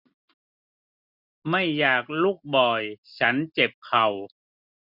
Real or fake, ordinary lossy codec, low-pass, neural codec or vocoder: real; none; 5.4 kHz; none